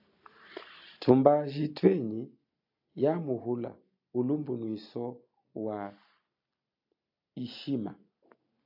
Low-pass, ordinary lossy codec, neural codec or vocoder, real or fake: 5.4 kHz; AAC, 48 kbps; none; real